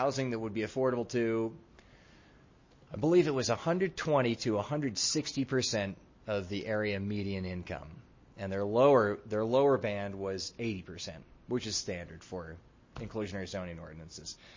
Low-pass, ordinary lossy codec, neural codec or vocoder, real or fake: 7.2 kHz; MP3, 32 kbps; none; real